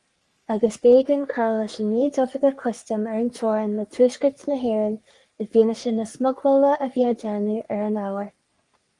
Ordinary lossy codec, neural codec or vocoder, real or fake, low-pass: Opus, 32 kbps; codec, 44.1 kHz, 3.4 kbps, Pupu-Codec; fake; 10.8 kHz